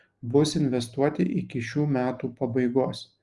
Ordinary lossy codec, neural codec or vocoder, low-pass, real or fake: Opus, 32 kbps; none; 10.8 kHz; real